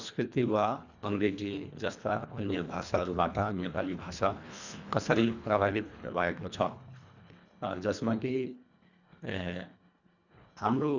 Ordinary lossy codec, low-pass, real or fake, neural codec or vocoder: none; 7.2 kHz; fake; codec, 24 kHz, 1.5 kbps, HILCodec